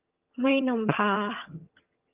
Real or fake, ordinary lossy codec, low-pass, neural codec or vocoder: fake; Opus, 32 kbps; 3.6 kHz; vocoder, 22.05 kHz, 80 mel bands, HiFi-GAN